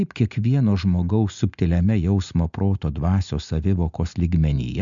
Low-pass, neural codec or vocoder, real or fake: 7.2 kHz; none; real